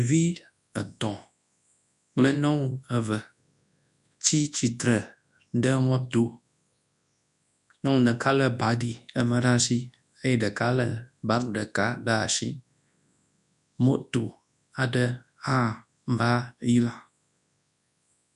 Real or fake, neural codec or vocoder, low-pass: fake; codec, 24 kHz, 0.9 kbps, WavTokenizer, large speech release; 10.8 kHz